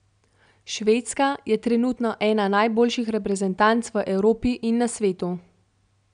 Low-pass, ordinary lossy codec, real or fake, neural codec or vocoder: 9.9 kHz; none; real; none